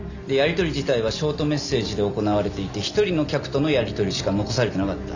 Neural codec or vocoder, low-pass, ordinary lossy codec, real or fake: none; 7.2 kHz; none; real